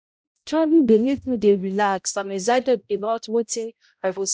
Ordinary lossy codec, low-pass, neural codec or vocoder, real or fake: none; none; codec, 16 kHz, 0.5 kbps, X-Codec, HuBERT features, trained on balanced general audio; fake